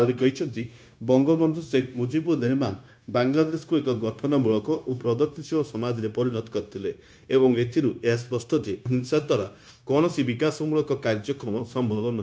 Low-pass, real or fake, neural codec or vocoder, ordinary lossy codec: none; fake; codec, 16 kHz, 0.9 kbps, LongCat-Audio-Codec; none